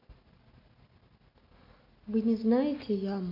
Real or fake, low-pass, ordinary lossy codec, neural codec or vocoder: real; 5.4 kHz; AAC, 32 kbps; none